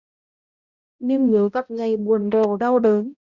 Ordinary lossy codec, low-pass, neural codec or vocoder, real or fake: Opus, 64 kbps; 7.2 kHz; codec, 16 kHz, 0.5 kbps, X-Codec, HuBERT features, trained on balanced general audio; fake